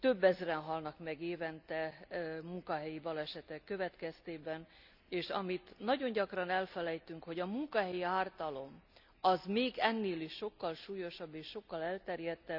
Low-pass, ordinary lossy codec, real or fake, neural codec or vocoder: 5.4 kHz; none; real; none